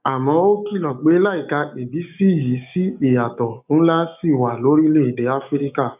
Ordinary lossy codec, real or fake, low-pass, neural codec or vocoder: none; fake; 3.6 kHz; codec, 16 kHz, 6 kbps, DAC